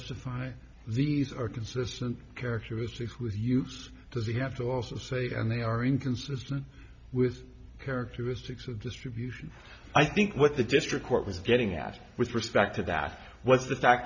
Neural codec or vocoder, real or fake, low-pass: none; real; 7.2 kHz